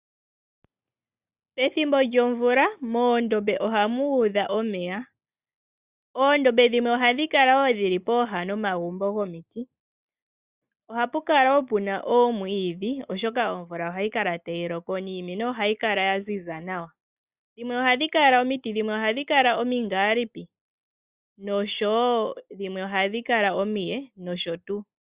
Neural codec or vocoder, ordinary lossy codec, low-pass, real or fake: none; Opus, 64 kbps; 3.6 kHz; real